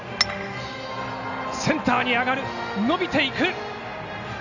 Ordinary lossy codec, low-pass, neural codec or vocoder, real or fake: none; 7.2 kHz; none; real